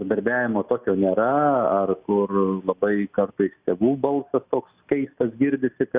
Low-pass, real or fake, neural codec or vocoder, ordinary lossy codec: 3.6 kHz; real; none; Opus, 64 kbps